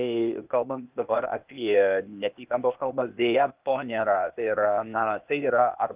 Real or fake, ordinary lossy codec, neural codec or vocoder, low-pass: fake; Opus, 24 kbps; codec, 16 kHz, 0.8 kbps, ZipCodec; 3.6 kHz